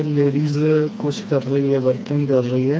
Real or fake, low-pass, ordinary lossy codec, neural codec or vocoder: fake; none; none; codec, 16 kHz, 2 kbps, FreqCodec, smaller model